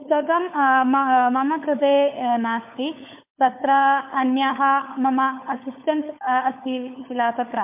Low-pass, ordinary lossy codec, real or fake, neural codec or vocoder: 3.6 kHz; MP3, 32 kbps; fake; codec, 16 kHz, 4 kbps, FunCodec, trained on LibriTTS, 50 frames a second